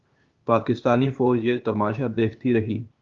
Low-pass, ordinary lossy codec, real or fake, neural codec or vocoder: 7.2 kHz; Opus, 32 kbps; fake; codec, 16 kHz, 0.8 kbps, ZipCodec